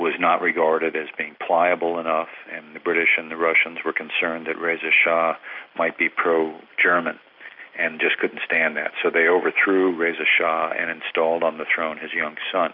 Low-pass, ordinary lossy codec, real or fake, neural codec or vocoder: 5.4 kHz; MP3, 48 kbps; real; none